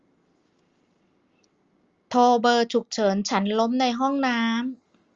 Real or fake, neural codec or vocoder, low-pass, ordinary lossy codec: real; none; 7.2 kHz; Opus, 32 kbps